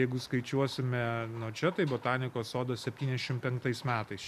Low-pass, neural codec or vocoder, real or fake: 14.4 kHz; none; real